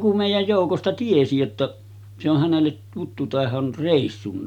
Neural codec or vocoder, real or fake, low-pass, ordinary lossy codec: none; real; 19.8 kHz; none